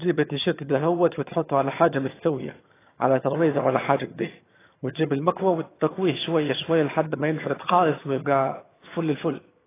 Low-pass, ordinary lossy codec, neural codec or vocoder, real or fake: 3.6 kHz; AAC, 16 kbps; vocoder, 22.05 kHz, 80 mel bands, HiFi-GAN; fake